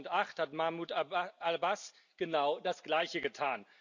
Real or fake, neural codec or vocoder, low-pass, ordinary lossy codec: real; none; 7.2 kHz; none